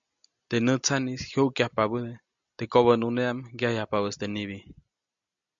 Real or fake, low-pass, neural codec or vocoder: real; 7.2 kHz; none